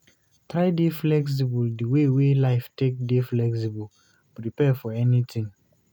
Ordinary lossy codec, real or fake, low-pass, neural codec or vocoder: none; real; 19.8 kHz; none